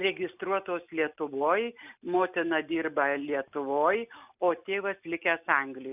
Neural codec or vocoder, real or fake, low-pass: none; real; 3.6 kHz